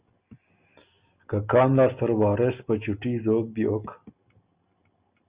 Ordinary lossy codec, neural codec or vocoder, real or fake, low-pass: Opus, 64 kbps; none; real; 3.6 kHz